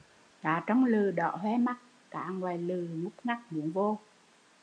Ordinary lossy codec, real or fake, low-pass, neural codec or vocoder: AAC, 64 kbps; real; 9.9 kHz; none